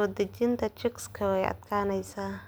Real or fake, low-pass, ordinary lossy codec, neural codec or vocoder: real; none; none; none